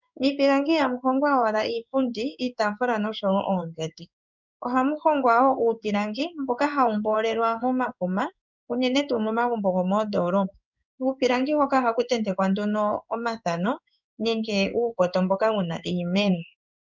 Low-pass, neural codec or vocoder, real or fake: 7.2 kHz; codec, 16 kHz in and 24 kHz out, 1 kbps, XY-Tokenizer; fake